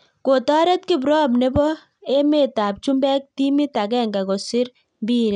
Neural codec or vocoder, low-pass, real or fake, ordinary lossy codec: none; 9.9 kHz; real; none